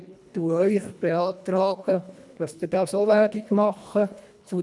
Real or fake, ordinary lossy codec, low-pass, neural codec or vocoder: fake; none; none; codec, 24 kHz, 1.5 kbps, HILCodec